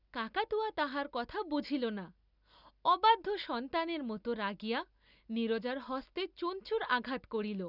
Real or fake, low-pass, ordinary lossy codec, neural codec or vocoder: real; 5.4 kHz; none; none